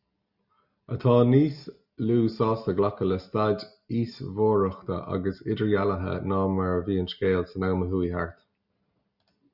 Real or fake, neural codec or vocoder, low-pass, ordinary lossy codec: real; none; 5.4 kHz; Opus, 64 kbps